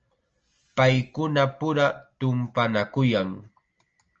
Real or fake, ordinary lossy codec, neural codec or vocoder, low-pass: real; Opus, 24 kbps; none; 7.2 kHz